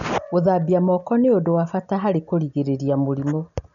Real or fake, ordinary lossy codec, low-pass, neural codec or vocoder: real; none; 7.2 kHz; none